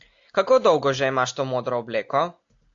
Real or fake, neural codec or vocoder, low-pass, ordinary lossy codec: real; none; 7.2 kHz; AAC, 64 kbps